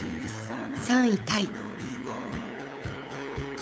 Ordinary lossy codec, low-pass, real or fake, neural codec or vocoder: none; none; fake; codec, 16 kHz, 8 kbps, FunCodec, trained on LibriTTS, 25 frames a second